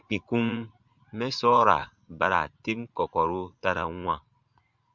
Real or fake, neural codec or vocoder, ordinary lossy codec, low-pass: fake; vocoder, 44.1 kHz, 80 mel bands, Vocos; Opus, 64 kbps; 7.2 kHz